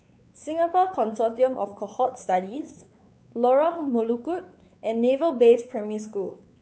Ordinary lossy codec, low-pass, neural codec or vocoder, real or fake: none; none; codec, 16 kHz, 4 kbps, X-Codec, WavLM features, trained on Multilingual LibriSpeech; fake